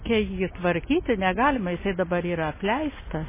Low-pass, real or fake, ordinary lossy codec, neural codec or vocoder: 3.6 kHz; real; MP3, 16 kbps; none